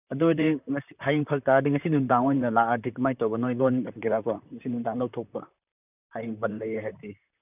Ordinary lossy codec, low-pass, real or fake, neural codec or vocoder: none; 3.6 kHz; fake; vocoder, 44.1 kHz, 128 mel bands, Pupu-Vocoder